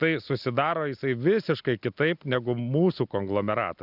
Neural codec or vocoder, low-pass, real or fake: none; 5.4 kHz; real